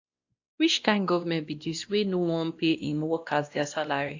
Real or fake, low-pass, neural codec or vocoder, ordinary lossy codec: fake; 7.2 kHz; codec, 16 kHz, 1 kbps, X-Codec, WavLM features, trained on Multilingual LibriSpeech; AAC, 48 kbps